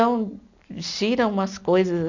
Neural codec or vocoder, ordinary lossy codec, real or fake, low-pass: none; none; real; 7.2 kHz